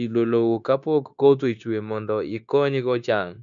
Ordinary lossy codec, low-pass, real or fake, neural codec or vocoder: none; 7.2 kHz; fake; codec, 16 kHz, 0.9 kbps, LongCat-Audio-Codec